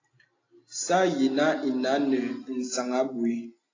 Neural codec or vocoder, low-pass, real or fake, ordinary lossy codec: none; 7.2 kHz; real; AAC, 32 kbps